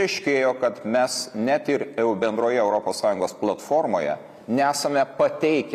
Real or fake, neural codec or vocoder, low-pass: real; none; 14.4 kHz